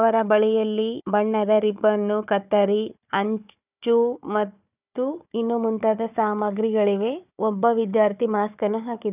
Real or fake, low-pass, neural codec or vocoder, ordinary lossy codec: fake; 3.6 kHz; codec, 16 kHz, 4 kbps, FunCodec, trained on Chinese and English, 50 frames a second; none